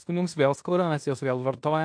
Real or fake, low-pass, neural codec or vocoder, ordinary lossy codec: fake; 9.9 kHz; codec, 16 kHz in and 24 kHz out, 0.9 kbps, LongCat-Audio-Codec, fine tuned four codebook decoder; AAC, 64 kbps